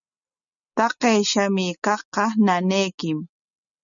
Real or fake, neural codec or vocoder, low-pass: real; none; 7.2 kHz